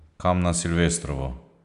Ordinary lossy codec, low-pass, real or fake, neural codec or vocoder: none; 10.8 kHz; real; none